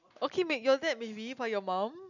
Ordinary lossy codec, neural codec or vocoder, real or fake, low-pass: none; none; real; 7.2 kHz